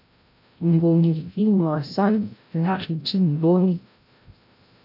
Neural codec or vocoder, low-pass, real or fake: codec, 16 kHz, 0.5 kbps, FreqCodec, larger model; 5.4 kHz; fake